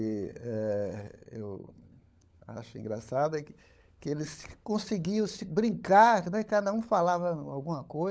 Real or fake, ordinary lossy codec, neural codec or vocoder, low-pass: fake; none; codec, 16 kHz, 16 kbps, FunCodec, trained on LibriTTS, 50 frames a second; none